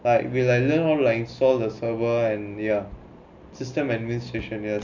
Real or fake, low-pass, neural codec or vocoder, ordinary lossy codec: real; 7.2 kHz; none; none